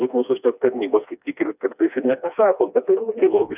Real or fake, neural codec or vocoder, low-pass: fake; codec, 16 kHz, 2 kbps, FreqCodec, smaller model; 3.6 kHz